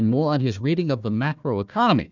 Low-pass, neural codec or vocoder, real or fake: 7.2 kHz; codec, 16 kHz, 1 kbps, FunCodec, trained on Chinese and English, 50 frames a second; fake